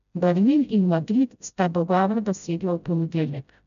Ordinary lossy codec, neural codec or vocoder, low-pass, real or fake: none; codec, 16 kHz, 0.5 kbps, FreqCodec, smaller model; 7.2 kHz; fake